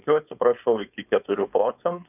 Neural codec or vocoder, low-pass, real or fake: codec, 16 kHz, 4.8 kbps, FACodec; 3.6 kHz; fake